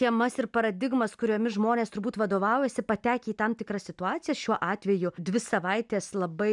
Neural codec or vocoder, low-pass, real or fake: none; 10.8 kHz; real